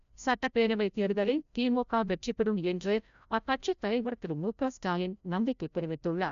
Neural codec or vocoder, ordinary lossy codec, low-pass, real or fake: codec, 16 kHz, 0.5 kbps, FreqCodec, larger model; none; 7.2 kHz; fake